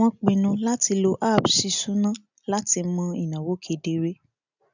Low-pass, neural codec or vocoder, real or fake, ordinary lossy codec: 7.2 kHz; none; real; none